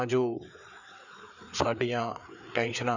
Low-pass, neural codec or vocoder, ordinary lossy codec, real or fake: 7.2 kHz; codec, 16 kHz, 4 kbps, FreqCodec, larger model; none; fake